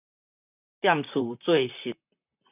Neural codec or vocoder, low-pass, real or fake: vocoder, 44.1 kHz, 128 mel bands, Pupu-Vocoder; 3.6 kHz; fake